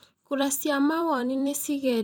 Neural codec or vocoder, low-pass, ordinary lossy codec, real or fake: vocoder, 44.1 kHz, 128 mel bands every 256 samples, BigVGAN v2; none; none; fake